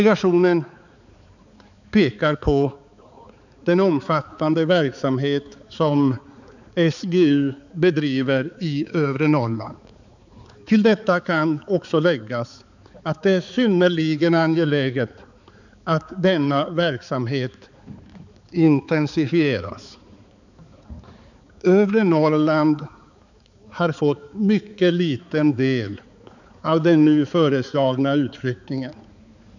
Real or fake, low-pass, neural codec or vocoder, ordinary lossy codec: fake; 7.2 kHz; codec, 16 kHz, 4 kbps, X-Codec, HuBERT features, trained on balanced general audio; none